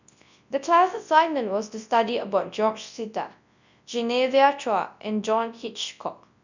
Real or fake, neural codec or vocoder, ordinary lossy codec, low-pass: fake; codec, 24 kHz, 0.9 kbps, WavTokenizer, large speech release; none; 7.2 kHz